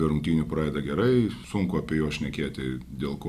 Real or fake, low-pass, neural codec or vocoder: real; 14.4 kHz; none